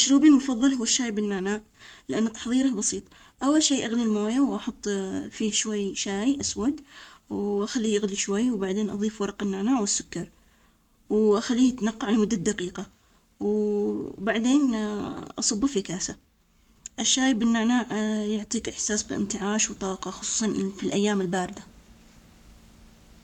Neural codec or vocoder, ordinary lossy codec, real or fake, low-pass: codec, 44.1 kHz, 7.8 kbps, Pupu-Codec; none; fake; 19.8 kHz